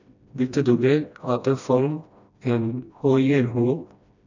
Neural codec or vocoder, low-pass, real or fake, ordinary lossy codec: codec, 16 kHz, 1 kbps, FreqCodec, smaller model; 7.2 kHz; fake; none